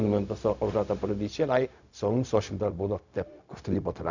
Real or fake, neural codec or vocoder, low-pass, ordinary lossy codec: fake; codec, 16 kHz, 0.4 kbps, LongCat-Audio-Codec; 7.2 kHz; none